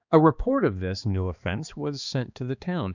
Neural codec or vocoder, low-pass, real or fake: codec, 16 kHz, 2 kbps, X-Codec, HuBERT features, trained on balanced general audio; 7.2 kHz; fake